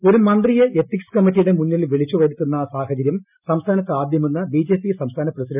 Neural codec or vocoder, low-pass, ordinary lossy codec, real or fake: none; 3.6 kHz; none; real